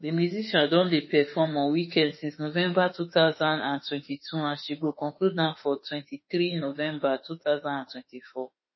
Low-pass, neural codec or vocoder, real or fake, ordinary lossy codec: 7.2 kHz; autoencoder, 48 kHz, 32 numbers a frame, DAC-VAE, trained on Japanese speech; fake; MP3, 24 kbps